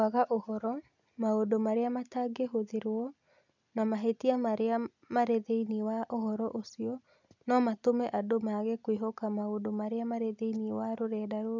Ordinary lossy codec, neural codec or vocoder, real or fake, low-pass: none; none; real; 7.2 kHz